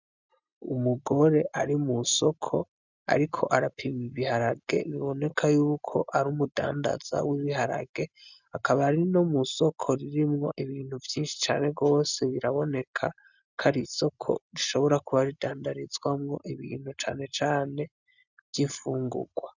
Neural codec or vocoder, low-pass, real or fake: none; 7.2 kHz; real